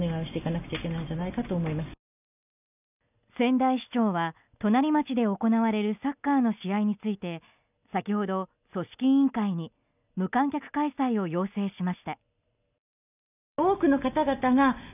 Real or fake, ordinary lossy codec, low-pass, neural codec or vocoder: real; none; 3.6 kHz; none